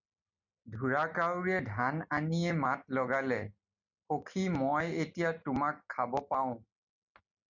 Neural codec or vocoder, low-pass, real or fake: none; 7.2 kHz; real